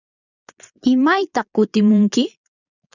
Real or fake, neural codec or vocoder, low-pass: fake; vocoder, 22.05 kHz, 80 mel bands, Vocos; 7.2 kHz